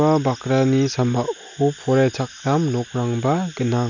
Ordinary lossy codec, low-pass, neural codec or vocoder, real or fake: none; 7.2 kHz; none; real